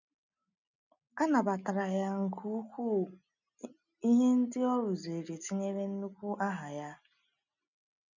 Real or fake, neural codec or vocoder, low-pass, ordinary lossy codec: real; none; 7.2 kHz; none